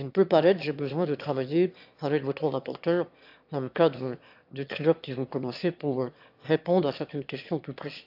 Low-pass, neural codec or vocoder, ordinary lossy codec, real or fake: 5.4 kHz; autoencoder, 22.05 kHz, a latent of 192 numbers a frame, VITS, trained on one speaker; AAC, 48 kbps; fake